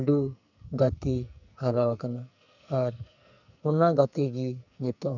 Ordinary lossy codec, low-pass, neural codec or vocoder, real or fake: none; 7.2 kHz; codec, 44.1 kHz, 2.6 kbps, SNAC; fake